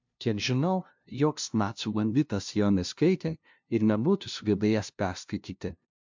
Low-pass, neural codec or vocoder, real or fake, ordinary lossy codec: 7.2 kHz; codec, 16 kHz, 0.5 kbps, FunCodec, trained on LibriTTS, 25 frames a second; fake; MP3, 64 kbps